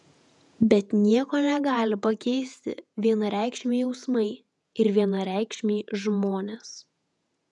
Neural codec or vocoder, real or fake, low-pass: vocoder, 48 kHz, 128 mel bands, Vocos; fake; 10.8 kHz